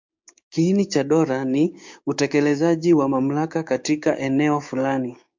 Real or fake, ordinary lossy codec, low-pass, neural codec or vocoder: fake; MP3, 64 kbps; 7.2 kHz; codec, 16 kHz, 6 kbps, DAC